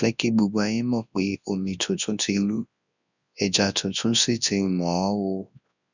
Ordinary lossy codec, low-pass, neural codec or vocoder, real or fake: none; 7.2 kHz; codec, 24 kHz, 0.9 kbps, WavTokenizer, large speech release; fake